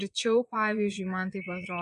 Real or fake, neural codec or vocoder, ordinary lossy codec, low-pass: fake; vocoder, 22.05 kHz, 80 mel bands, Vocos; MP3, 64 kbps; 9.9 kHz